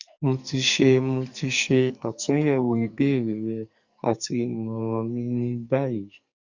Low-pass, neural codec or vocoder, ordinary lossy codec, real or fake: 7.2 kHz; codec, 44.1 kHz, 2.6 kbps, SNAC; Opus, 64 kbps; fake